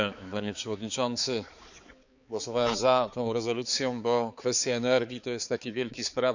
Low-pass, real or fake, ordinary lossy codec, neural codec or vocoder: 7.2 kHz; fake; none; codec, 16 kHz, 4 kbps, X-Codec, HuBERT features, trained on balanced general audio